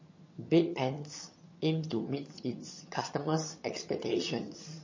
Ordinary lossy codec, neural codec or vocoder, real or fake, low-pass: MP3, 32 kbps; vocoder, 22.05 kHz, 80 mel bands, HiFi-GAN; fake; 7.2 kHz